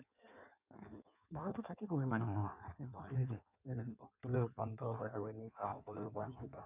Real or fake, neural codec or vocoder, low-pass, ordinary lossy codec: fake; codec, 16 kHz in and 24 kHz out, 1.1 kbps, FireRedTTS-2 codec; 3.6 kHz; none